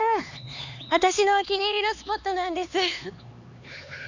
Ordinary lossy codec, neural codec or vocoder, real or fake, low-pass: none; codec, 16 kHz, 4 kbps, X-Codec, HuBERT features, trained on LibriSpeech; fake; 7.2 kHz